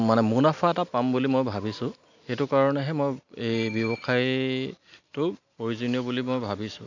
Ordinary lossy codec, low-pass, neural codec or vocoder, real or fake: none; 7.2 kHz; none; real